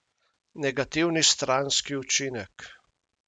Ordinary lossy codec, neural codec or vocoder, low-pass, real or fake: MP3, 96 kbps; none; 9.9 kHz; real